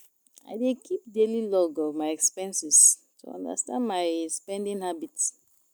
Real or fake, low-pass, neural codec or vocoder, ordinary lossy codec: real; none; none; none